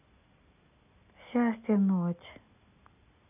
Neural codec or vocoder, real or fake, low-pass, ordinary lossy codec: none; real; 3.6 kHz; none